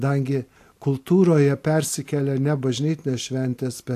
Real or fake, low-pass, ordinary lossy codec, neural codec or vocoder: real; 14.4 kHz; AAC, 64 kbps; none